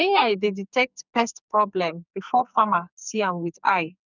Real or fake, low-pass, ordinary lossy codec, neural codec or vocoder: fake; 7.2 kHz; none; codec, 44.1 kHz, 2.6 kbps, SNAC